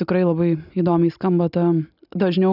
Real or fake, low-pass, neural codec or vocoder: real; 5.4 kHz; none